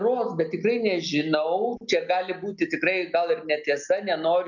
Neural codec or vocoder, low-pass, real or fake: none; 7.2 kHz; real